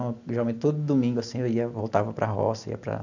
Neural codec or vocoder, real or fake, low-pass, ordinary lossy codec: none; real; 7.2 kHz; none